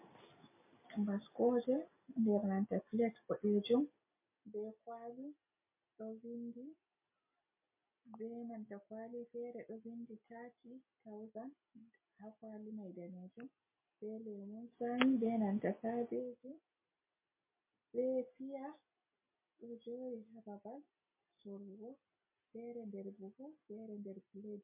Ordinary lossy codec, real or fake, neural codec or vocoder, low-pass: MP3, 24 kbps; real; none; 3.6 kHz